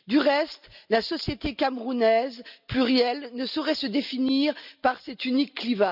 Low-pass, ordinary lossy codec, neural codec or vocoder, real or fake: 5.4 kHz; none; none; real